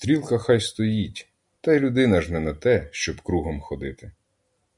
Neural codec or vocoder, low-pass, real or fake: none; 10.8 kHz; real